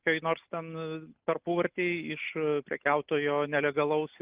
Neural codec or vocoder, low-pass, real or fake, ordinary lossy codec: none; 3.6 kHz; real; Opus, 24 kbps